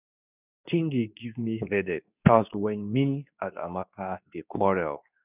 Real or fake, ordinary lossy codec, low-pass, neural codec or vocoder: fake; none; 3.6 kHz; codec, 24 kHz, 0.9 kbps, WavTokenizer, medium speech release version 2